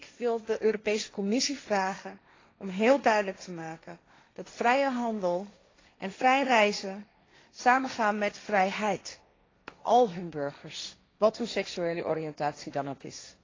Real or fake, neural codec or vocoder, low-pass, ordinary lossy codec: fake; codec, 16 kHz, 1.1 kbps, Voila-Tokenizer; 7.2 kHz; AAC, 32 kbps